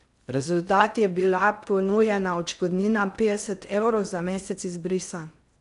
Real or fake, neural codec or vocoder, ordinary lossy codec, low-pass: fake; codec, 16 kHz in and 24 kHz out, 0.6 kbps, FocalCodec, streaming, 4096 codes; none; 10.8 kHz